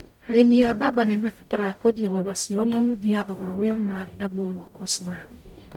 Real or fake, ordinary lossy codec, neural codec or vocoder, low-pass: fake; none; codec, 44.1 kHz, 0.9 kbps, DAC; 19.8 kHz